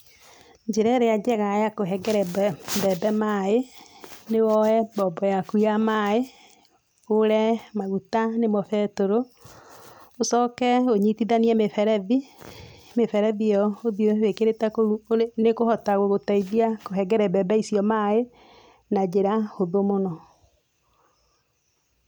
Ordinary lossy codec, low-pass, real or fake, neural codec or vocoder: none; none; real; none